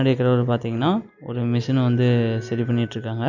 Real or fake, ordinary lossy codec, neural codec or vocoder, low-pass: real; none; none; 7.2 kHz